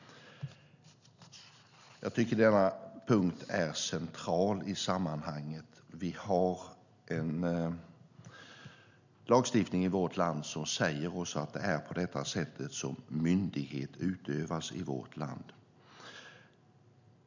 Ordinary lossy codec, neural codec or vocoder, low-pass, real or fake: none; none; 7.2 kHz; real